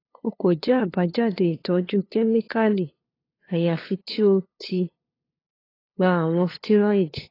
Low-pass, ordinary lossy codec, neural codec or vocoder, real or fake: 5.4 kHz; AAC, 24 kbps; codec, 16 kHz, 2 kbps, FunCodec, trained on LibriTTS, 25 frames a second; fake